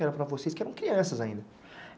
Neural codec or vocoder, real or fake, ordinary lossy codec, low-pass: none; real; none; none